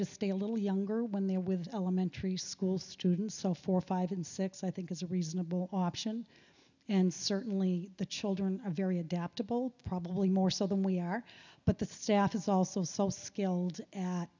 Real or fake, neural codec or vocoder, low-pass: real; none; 7.2 kHz